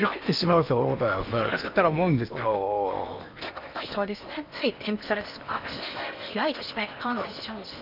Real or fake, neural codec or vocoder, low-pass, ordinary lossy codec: fake; codec, 16 kHz in and 24 kHz out, 0.8 kbps, FocalCodec, streaming, 65536 codes; 5.4 kHz; none